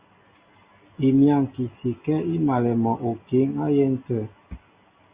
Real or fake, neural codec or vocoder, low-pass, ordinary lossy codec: real; none; 3.6 kHz; Opus, 64 kbps